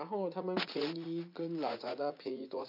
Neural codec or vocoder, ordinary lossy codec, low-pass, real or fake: vocoder, 44.1 kHz, 80 mel bands, Vocos; none; 5.4 kHz; fake